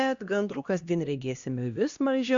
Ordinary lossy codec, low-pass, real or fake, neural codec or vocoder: Opus, 64 kbps; 7.2 kHz; fake; codec, 16 kHz, 1 kbps, X-Codec, HuBERT features, trained on LibriSpeech